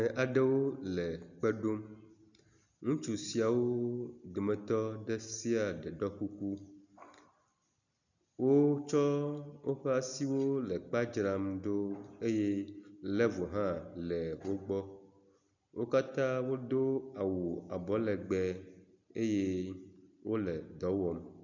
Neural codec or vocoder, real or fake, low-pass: none; real; 7.2 kHz